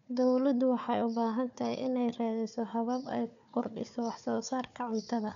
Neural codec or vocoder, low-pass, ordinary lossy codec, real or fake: codec, 16 kHz, 4 kbps, FunCodec, trained on Chinese and English, 50 frames a second; 7.2 kHz; none; fake